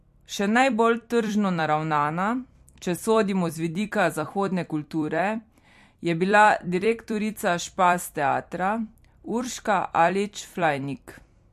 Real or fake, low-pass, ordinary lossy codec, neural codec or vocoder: fake; 14.4 kHz; MP3, 64 kbps; vocoder, 44.1 kHz, 128 mel bands every 256 samples, BigVGAN v2